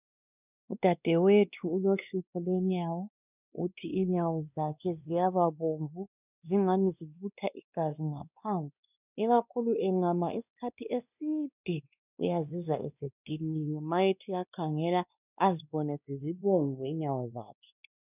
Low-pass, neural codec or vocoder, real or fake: 3.6 kHz; codec, 16 kHz, 2 kbps, X-Codec, WavLM features, trained on Multilingual LibriSpeech; fake